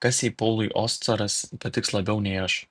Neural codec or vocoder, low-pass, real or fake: none; 9.9 kHz; real